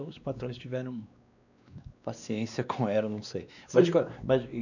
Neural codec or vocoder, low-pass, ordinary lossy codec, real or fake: codec, 16 kHz, 2 kbps, X-Codec, WavLM features, trained on Multilingual LibriSpeech; 7.2 kHz; none; fake